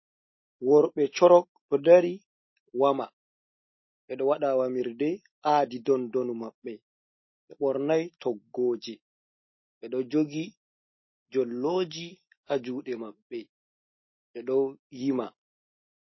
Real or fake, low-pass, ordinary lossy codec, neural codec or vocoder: real; 7.2 kHz; MP3, 24 kbps; none